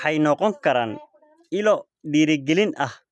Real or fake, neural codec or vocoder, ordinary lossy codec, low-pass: real; none; none; none